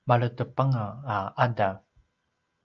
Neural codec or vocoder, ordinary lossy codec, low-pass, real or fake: none; Opus, 24 kbps; 7.2 kHz; real